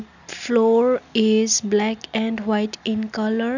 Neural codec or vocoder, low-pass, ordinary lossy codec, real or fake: none; 7.2 kHz; none; real